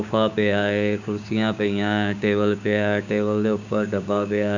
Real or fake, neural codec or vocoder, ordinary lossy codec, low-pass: fake; codec, 16 kHz, 6 kbps, DAC; none; 7.2 kHz